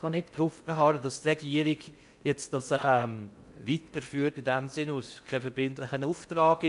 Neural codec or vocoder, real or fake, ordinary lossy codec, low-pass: codec, 16 kHz in and 24 kHz out, 0.6 kbps, FocalCodec, streaming, 4096 codes; fake; MP3, 64 kbps; 10.8 kHz